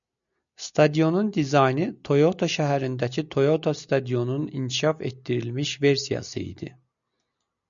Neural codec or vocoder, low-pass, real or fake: none; 7.2 kHz; real